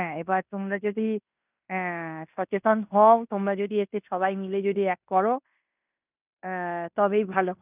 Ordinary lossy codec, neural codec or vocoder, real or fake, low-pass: none; codec, 16 kHz in and 24 kHz out, 0.9 kbps, LongCat-Audio-Codec, fine tuned four codebook decoder; fake; 3.6 kHz